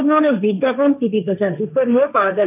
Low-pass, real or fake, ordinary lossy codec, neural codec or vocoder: 3.6 kHz; fake; none; codec, 16 kHz, 1.1 kbps, Voila-Tokenizer